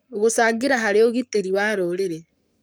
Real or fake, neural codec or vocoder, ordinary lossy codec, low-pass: fake; codec, 44.1 kHz, 7.8 kbps, Pupu-Codec; none; none